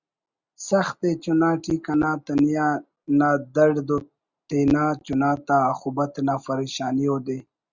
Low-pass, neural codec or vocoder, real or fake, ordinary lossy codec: 7.2 kHz; none; real; Opus, 64 kbps